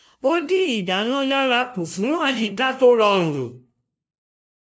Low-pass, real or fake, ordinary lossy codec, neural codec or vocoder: none; fake; none; codec, 16 kHz, 0.5 kbps, FunCodec, trained on LibriTTS, 25 frames a second